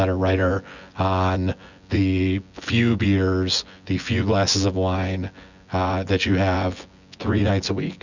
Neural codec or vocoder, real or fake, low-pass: vocoder, 24 kHz, 100 mel bands, Vocos; fake; 7.2 kHz